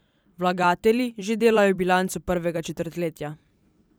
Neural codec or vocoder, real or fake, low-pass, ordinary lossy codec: vocoder, 44.1 kHz, 128 mel bands every 256 samples, BigVGAN v2; fake; none; none